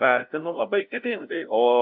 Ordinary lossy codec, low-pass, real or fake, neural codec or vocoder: AAC, 48 kbps; 5.4 kHz; fake; codec, 16 kHz, 0.5 kbps, FunCodec, trained on LibriTTS, 25 frames a second